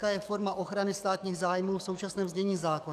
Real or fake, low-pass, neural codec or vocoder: fake; 14.4 kHz; codec, 44.1 kHz, 7.8 kbps, DAC